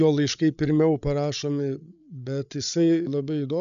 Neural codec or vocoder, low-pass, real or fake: codec, 16 kHz, 8 kbps, FreqCodec, larger model; 7.2 kHz; fake